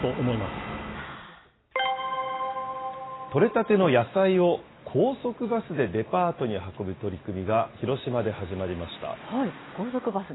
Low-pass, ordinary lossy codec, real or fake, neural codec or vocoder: 7.2 kHz; AAC, 16 kbps; real; none